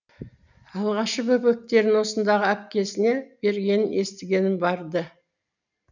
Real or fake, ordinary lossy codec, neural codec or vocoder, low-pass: real; none; none; 7.2 kHz